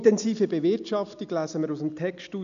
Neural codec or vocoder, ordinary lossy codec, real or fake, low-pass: none; none; real; 7.2 kHz